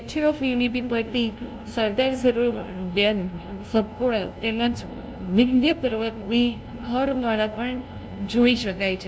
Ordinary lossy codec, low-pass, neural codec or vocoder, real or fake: none; none; codec, 16 kHz, 0.5 kbps, FunCodec, trained on LibriTTS, 25 frames a second; fake